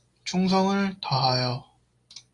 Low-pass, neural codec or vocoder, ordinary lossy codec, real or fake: 10.8 kHz; none; AAC, 48 kbps; real